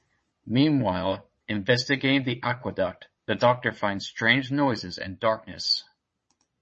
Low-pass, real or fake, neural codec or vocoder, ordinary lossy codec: 9.9 kHz; fake; vocoder, 22.05 kHz, 80 mel bands, Vocos; MP3, 32 kbps